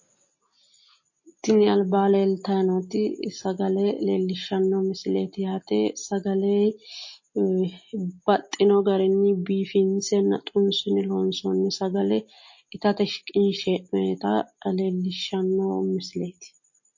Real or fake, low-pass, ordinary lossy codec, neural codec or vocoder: real; 7.2 kHz; MP3, 32 kbps; none